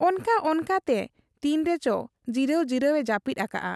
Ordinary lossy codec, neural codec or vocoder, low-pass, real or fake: none; none; none; real